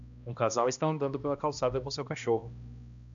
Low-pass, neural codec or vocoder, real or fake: 7.2 kHz; codec, 16 kHz, 1 kbps, X-Codec, HuBERT features, trained on balanced general audio; fake